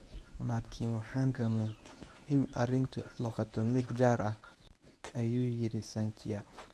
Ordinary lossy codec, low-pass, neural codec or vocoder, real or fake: none; none; codec, 24 kHz, 0.9 kbps, WavTokenizer, medium speech release version 1; fake